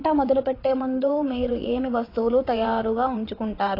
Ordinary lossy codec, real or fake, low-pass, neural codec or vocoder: AAC, 24 kbps; fake; 5.4 kHz; vocoder, 22.05 kHz, 80 mel bands, WaveNeXt